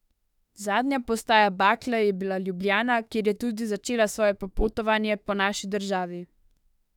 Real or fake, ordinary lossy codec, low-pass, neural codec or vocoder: fake; none; 19.8 kHz; autoencoder, 48 kHz, 32 numbers a frame, DAC-VAE, trained on Japanese speech